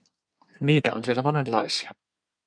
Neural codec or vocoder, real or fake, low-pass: codec, 24 kHz, 1 kbps, SNAC; fake; 9.9 kHz